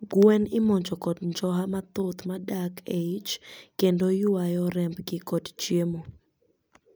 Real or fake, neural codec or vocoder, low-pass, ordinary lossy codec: real; none; none; none